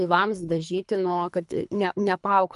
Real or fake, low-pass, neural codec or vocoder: fake; 10.8 kHz; codec, 24 kHz, 3 kbps, HILCodec